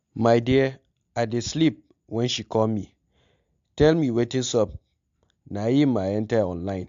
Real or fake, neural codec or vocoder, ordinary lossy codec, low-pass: real; none; AAC, 64 kbps; 7.2 kHz